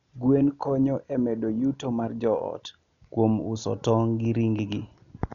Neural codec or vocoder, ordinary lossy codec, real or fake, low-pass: none; none; real; 7.2 kHz